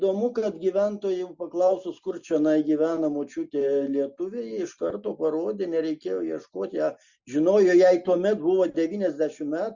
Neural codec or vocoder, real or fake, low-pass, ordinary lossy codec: none; real; 7.2 kHz; Opus, 64 kbps